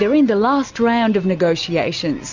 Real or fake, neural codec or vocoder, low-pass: real; none; 7.2 kHz